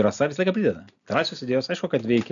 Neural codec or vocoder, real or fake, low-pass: none; real; 7.2 kHz